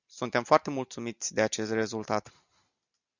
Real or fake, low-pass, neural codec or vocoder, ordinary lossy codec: real; 7.2 kHz; none; Opus, 64 kbps